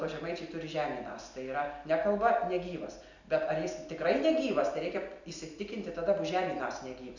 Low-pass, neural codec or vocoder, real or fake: 7.2 kHz; none; real